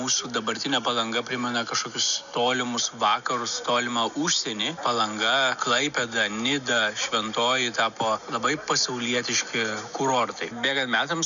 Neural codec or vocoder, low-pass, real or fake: none; 7.2 kHz; real